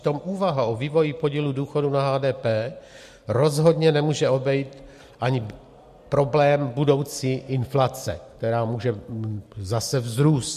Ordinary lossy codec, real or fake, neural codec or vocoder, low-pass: MP3, 64 kbps; real; none; 14.4 kHz